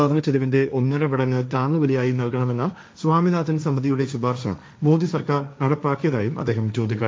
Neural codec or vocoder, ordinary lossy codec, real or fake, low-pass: codec, 16 kHz, 1.1 kbps, Voila-Tokenizer; none; fake; none